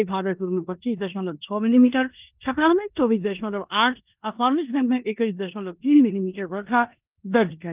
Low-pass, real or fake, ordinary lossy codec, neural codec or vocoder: 3.6 kHz; fake; Opus, 24 kbps; codec, 16 kHz in and 24 kHz out, 0.9 kbps, LongCat-Audio-Codec, four codebook decoder